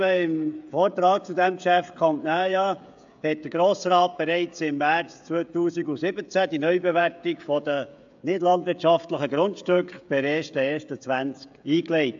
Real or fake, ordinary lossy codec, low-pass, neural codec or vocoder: fake; AAC, 64 kbps; 7.2 kHz; codec, 16 kHz, 16 kbps, FreqCodec, smaller model